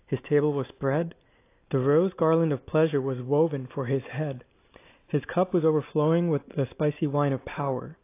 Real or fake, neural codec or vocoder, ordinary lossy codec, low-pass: real; none; AAC, 24 kbps; 3.6 kHz